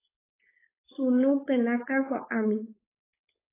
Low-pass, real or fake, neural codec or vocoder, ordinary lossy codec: 3.6 kHz; fake; codec, 16 kHz, 4.8 kbps, FACodec; AAC, 16 kbps